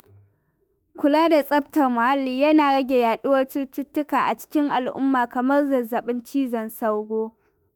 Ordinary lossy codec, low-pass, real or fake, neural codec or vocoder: none; none; fake; autoencoder, 48 kHz, 32 numbers a frame, DAC-VAE, trained on Japanese speech